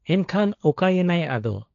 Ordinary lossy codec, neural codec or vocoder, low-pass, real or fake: none; codec, 16 kHz, 1.1 kbps, Voila-Tokenizer; 7.2 kHz; fake